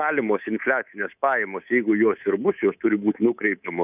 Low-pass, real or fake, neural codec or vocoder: 3.6 kHz; fake; codec, 24 kHz, 3.1 kbps, DualCodec